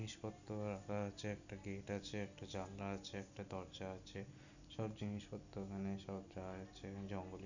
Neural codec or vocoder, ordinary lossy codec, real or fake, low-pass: none; none; real; 7.2 kHz